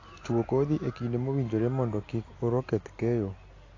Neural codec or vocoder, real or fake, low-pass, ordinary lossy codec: none; real; 7.2 kHz; AAC, 32 kbps